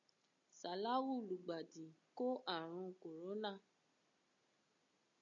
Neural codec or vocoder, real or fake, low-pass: none; real; 7.2 kHz